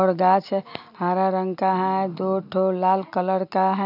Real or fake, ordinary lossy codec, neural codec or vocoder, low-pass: real; none; none; 5.4 kHz